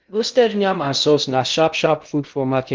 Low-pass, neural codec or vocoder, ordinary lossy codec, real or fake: 7.2 kHz; codec, 16 kHz in and 24 kHz out, 0.6 kbps, FocalCodec, streaming, 2048 codes; Opus, 24 kbps; fake